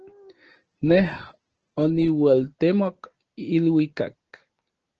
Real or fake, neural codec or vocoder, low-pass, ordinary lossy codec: real; none; 7.2 kHz; Opus, 16 kbps